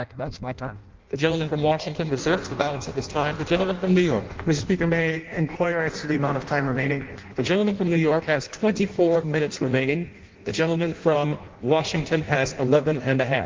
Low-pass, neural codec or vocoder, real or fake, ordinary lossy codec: 7.2 kHz; codec, 16 kHz in and 24 kHz out, 0.6 kbps, FireRedTTS-2 codec; fake; Opus, 32 kbps